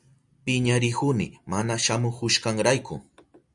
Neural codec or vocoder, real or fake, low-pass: none; real; 10.8 kHz